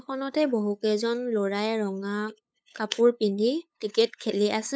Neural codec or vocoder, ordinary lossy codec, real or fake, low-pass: codec, 16 kHz, 8 kbps, FunCodec, trained on LibriTTS, 25 frames a second; none; fake; none